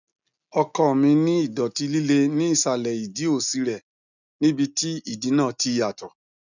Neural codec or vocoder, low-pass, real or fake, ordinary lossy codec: none; 7.2 kHz; real; none